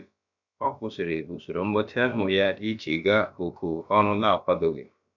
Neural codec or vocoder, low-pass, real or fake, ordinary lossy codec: codec, 16 kHz, about 1 kbps, DyCAST, with the encoder's durations; 7.2 kHz; fake; none